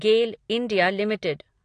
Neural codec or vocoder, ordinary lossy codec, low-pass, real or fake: none; AAC, 48 kbps; 9.9 kHz; real